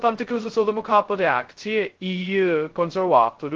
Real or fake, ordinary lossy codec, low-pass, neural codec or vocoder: fake; Opus, 16 kbps; 7.2 kHz; codec, 16 kHz, 0.2 kbps, FocalCodec